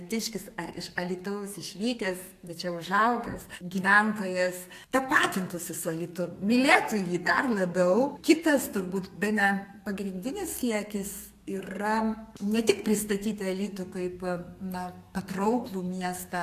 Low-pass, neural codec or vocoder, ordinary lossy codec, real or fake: 14.4 kHz; codec, 32 kHz, 1.9 kbps, SNAC; AAC, 64 kbps; fake